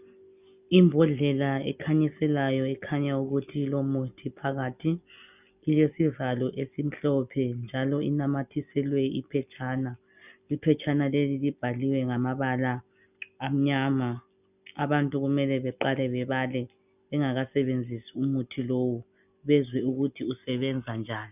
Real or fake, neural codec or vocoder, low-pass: real; none; 3.6 kHz